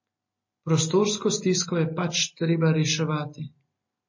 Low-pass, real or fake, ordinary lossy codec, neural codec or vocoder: 7.2 kHz; real; MP3, 32 kbps; none